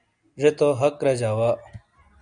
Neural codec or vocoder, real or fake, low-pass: none; real; 9.9 kHz